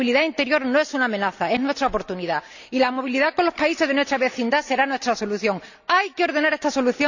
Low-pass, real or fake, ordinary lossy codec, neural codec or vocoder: 7.2 kHz; real; none; none